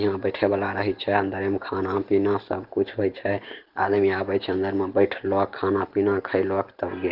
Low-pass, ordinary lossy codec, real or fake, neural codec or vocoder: 5.4 kHz; Opus, 16 kbps; real; none